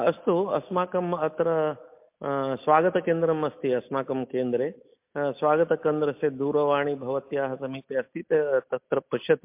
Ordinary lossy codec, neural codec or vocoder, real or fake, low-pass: MP3, 32 kbps; none; real; 3.6 kHz